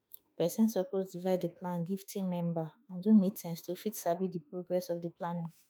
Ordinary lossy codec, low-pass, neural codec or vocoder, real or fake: none; none; autoencoder, 48 kHz, 32 numbers a frame, DAC-VAE, trained on Japanese speech; fake